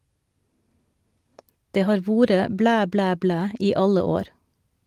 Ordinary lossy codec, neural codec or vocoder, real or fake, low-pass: Opus, 24 kbps; none; real; 14.4 kHz